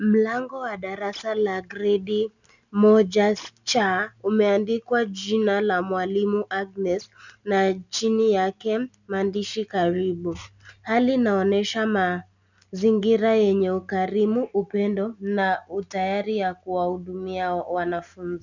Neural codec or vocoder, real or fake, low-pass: none; real; 7.2 kHz